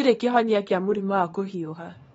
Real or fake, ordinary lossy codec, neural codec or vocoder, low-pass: fake; AAC, 24 kbps; codec, 24 kHz, 0.9 kbps, WavTokenizer, small release; 10.8 kHz